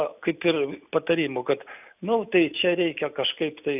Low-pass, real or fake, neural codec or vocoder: 3.6 kHz; real; none